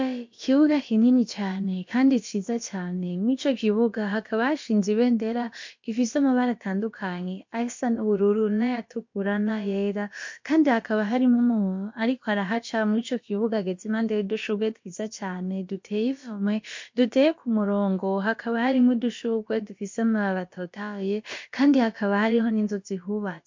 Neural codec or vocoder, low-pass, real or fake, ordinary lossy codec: codec, 16 kHz, about 1 kbps, DyCAST, with the encoder's durations; 7.2 kHz; fake; MP3, 48 kbps